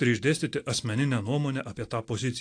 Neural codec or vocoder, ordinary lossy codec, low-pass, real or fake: none; AAC, 48 kbps; 9.9 kHz; real